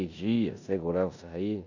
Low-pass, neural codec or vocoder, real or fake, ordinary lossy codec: 7.2 kHz; codec, 16 kHz in and 24 kHz out, 0.9 kbps, LongCat-Audio-Codec, four codebook decoder; fake; none